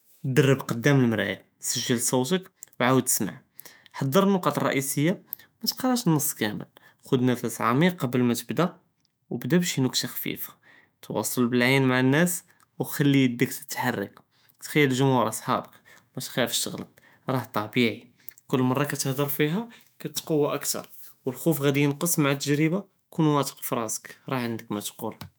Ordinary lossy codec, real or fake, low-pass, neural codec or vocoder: none; fake; none; autoencoder, 48 kHz, 128 numbers a frame, DAC-VAE, trained on Japanese speech